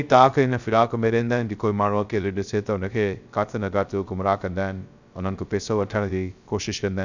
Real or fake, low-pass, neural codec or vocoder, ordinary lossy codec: fake; 7.2 kHz; codec, 16 kHz, 0.3 kbps, FocalCodec; none